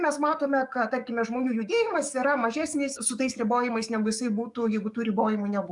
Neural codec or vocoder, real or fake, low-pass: codec, 44.1 kHz, 7.8 kbps, DAC; fake; 10.8 kHz